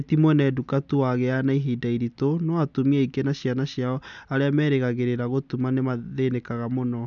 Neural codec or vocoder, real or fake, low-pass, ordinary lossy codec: none; real; 7.2 kHz; none